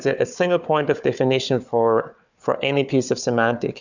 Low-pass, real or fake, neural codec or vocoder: 7.2 kHz; fake; codec, 16 kHz, 4 kbps, FunCodec, trained on Chinese and English, 50 frames a second